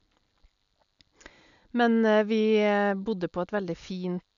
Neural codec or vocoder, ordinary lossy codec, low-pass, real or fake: none; none; 7.2 kHz; real